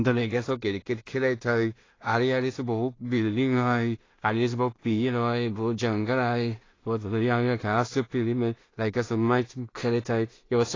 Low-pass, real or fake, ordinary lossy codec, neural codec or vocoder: 7.2 kHz; fake; AAC, 32 kbps; codec, 16 kHz in and 24 kHz out, 0.4 kbps, LongCat-Audio-Codec, two codebook decoder